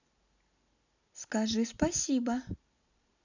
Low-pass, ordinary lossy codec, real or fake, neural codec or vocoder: 7.2 kHz; none; real; none